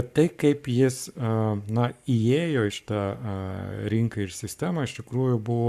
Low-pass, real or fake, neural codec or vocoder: 14.4 kHz; fake; codec, 44.1 kHz, 7.8 kbps, Pupu-Codec